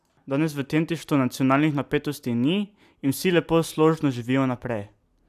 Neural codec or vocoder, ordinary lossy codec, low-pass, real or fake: none; AAC, 96 kbps; 14.4 kHz; real